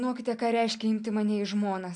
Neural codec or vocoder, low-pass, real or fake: none; 10.8 kHz; real